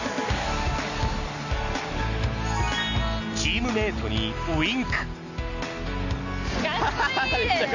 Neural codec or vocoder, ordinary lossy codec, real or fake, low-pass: none; none; real; 7.2 kHz